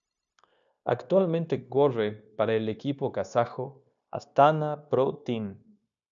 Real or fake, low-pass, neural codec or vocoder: fake; 7.2 kHz; codec, 16 kHz, 0.9 kbps, LongCat-Audio-Codec